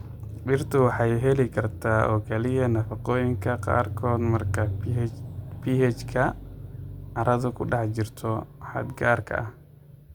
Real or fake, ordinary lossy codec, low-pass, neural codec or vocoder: real; Opus, 64 kbps; 19.8 kHz; none